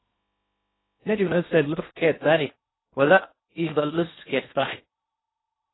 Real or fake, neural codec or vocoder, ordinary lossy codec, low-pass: fake; codec, 16 kHz in and 24 kHz out, 0.6 kbps, FocalCodec, streaming, 2048 codes; AAC, 16 kbps; 7.2 kHz